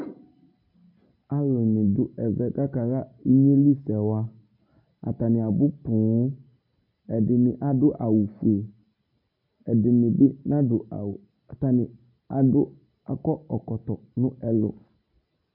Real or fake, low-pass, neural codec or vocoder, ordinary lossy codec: real; 5.4 kHz; none; MP3, 32 kbps